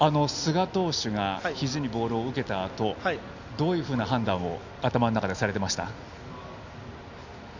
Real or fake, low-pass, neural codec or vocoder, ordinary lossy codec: real; 7.2 kHz; none; none